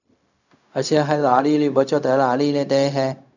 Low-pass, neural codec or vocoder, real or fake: 7.2 kHz; codec, 16 kHz, 0.4 kbps, LongCat-Audio-Codec; fake